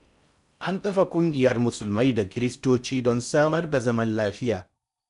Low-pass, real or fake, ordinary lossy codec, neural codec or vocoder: 10.8 kHz; fake; none; codec, 16 kHz in and 24 kHz out, 0.6 kbps, FocalCodec, streaming, 4096 codes